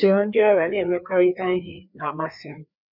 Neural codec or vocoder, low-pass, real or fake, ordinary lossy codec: codec, 16 kHz, 2 kbps, FreqCodec, larger model; 5.4 kHz; fake; none